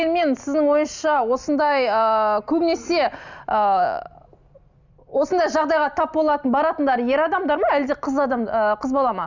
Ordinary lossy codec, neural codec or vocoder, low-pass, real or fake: none; none; 7.2 kHz; real